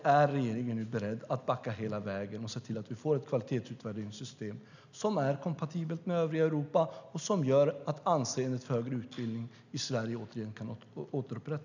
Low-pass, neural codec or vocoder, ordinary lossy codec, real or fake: 7.2 kHz; none; none; real